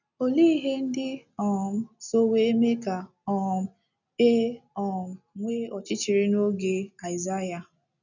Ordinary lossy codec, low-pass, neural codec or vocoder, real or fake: none; 7.2 kHz; none; real